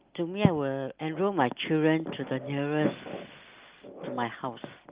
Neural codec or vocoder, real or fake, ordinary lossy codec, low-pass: none; real; Opus, 32 kbps; 3.6 kHz